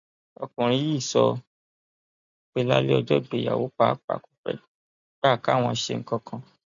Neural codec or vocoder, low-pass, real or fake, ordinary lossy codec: none; 7.2 kHz; real; MP3, 64 kbps